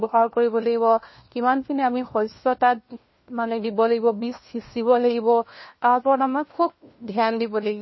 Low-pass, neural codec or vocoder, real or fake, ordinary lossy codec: 7.2 kHz; codec, 16 kHz, 1 kbps, FunCodec, trained on LibriTTS, 50 frames a second; fake; MP3, 24 kbps